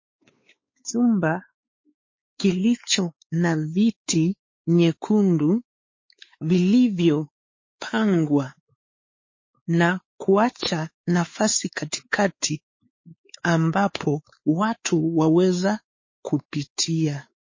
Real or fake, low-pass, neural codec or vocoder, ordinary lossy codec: fake; 7.2 kHz; codec, 16 kHz, 4 kbps, X-Codec, WavLM features, trained on Multilingual LibriSpeech; MP3, 32 kbps